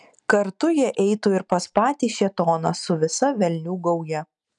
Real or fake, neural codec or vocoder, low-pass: real; none; 10.8 kHz